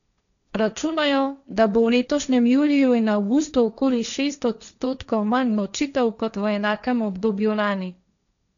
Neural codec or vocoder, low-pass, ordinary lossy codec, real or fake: codec, 16 kHz, 1.1 kbps, Voila-Tokenizer; 7.2 kHz; none; fake